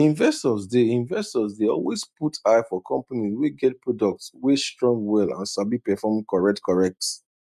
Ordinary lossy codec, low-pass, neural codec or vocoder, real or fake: none; 14.4 kHz; none; real